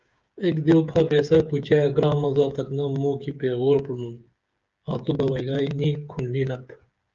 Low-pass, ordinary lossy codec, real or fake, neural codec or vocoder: 7.2 kHz; Opus, 24 kbps; fake; codec, 16 kHz, 8 kbps, FreqCodec, smaller model